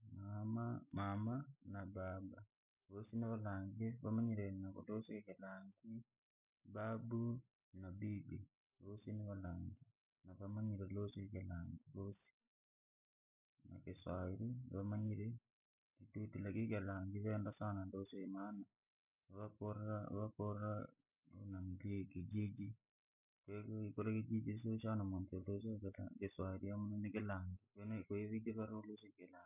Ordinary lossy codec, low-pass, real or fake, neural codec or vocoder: none; 3.6 kHz; real; none